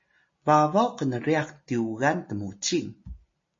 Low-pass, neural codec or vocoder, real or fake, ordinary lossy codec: 7.2 kHz; none; real; MP3, 32 kbps